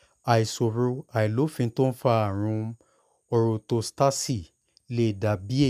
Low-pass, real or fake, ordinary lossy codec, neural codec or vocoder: 14.4 kHz; real; none; none